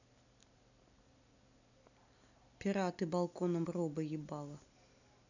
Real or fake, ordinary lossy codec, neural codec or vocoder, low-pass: real; none; none; 7.2 kHz